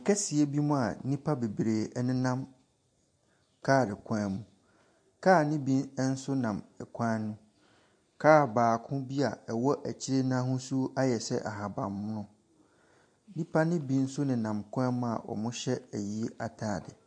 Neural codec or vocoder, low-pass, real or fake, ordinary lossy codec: none; 9.9 kHz; real; MP3, 48 kbps